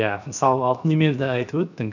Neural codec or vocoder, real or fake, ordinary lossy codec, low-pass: codec, 16 kHz, 0.7 kbps, FocalCodec; fake; none; 7.2 kHz